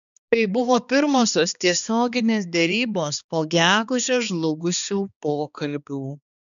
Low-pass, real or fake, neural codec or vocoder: 7.2 kHz; fake; codec, 16 kHz, 2 kbps, X-Codec, HuBERT features, trained on balanced general audio